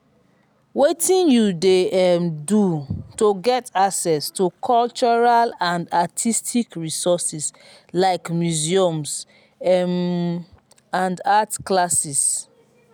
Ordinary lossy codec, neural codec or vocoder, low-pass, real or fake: none; none; none; real